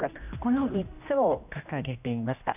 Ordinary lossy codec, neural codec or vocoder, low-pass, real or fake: none; codec, 16 kHz, 1 kbps, X-Codec, HuBERT features, trained on general audio; 3.6 kHz; fake